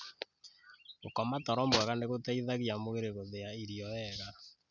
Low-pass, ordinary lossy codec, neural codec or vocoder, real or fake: 7.2 kHz; none; none; real